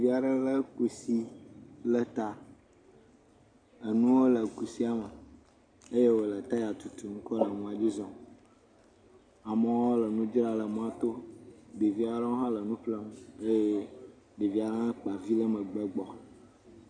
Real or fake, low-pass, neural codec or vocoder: real; 9.9 kHz; none